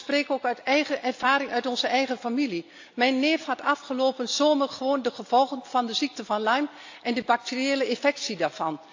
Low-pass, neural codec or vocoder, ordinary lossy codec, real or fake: 7.2 kHz; none; AAC, 48 kbps; real